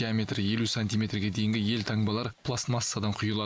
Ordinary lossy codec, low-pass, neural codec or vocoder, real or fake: none; none; none; real